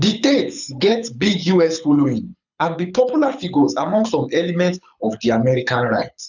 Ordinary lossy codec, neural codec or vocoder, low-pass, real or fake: none; codec, 24 kHz, 6 kbps, HILCodec; 7.2 kHz; fake